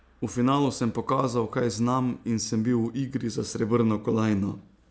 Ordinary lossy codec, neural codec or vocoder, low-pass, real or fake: none; none; none; real